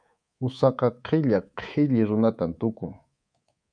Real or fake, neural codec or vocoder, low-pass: fake; codec, 24 kHz, 3.1 kbps, DualCodec; 9.9 kHz